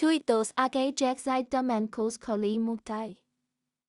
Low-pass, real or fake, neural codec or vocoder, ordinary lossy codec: 10.8 kHz; fake; codec, 16 kHz in and 24 kHz out, 0.4 kbps, LongCat-Audio-Codec, two codebook decoder; Opus, 64 kbps